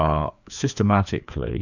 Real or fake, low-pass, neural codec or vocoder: fake; 7.2 kHz; codec, 16 kHz, 2 kbps, FreqCodec, larger model